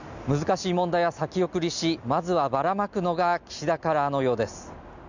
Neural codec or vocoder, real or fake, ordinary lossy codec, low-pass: none; real; none; 7.2 kHz